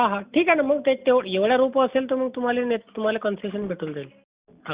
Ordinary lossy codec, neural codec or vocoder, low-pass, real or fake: Opus, 64 kbps; none; 3.6 kHz; real